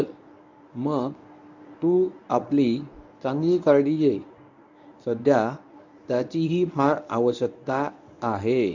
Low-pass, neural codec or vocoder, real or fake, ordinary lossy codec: 7.2 kHz; codec, 24 kHz, 0.9 kbps, WavTokenizer, medium speech release version 1; fake; none